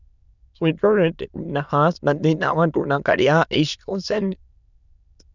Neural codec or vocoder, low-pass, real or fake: autoencoder, 22.05 kHz, a latent of 192 numbers a frame, VITS, trained on many speakers; 7.2 kHz; fake